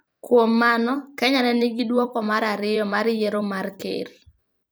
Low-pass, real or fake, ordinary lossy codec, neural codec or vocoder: none; fake; none; vocoder, 44.1 kHz, 128 mel bands every 256 samples, BigVGAN v2